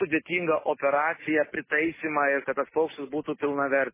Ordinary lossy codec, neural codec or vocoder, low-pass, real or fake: MP3, 16 kbps; none; 3.6 kHz; real